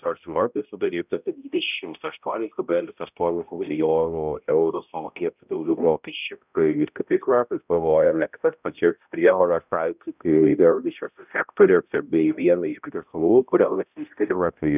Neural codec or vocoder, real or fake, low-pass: codec, 16 kHz, 0.5 kbps, X-Codec, HuBERT features, trained on balanced general audio; fake; 3.6 kHz